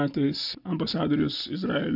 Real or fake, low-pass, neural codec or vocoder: real; 5.4 kHz; none